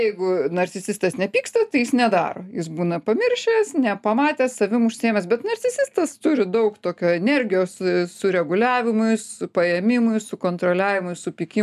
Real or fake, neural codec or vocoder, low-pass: real; none; 14.4 kHz